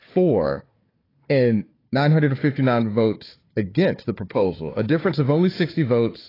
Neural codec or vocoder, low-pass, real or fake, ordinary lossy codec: codec, 16 kHz, 4 kbps, FunCodec, trained on Chinese and English, 50 frames a second; 5.4 kHz; fake; AAC, 24 kbps